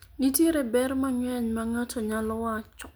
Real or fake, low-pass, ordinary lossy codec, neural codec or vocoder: real; none; none; none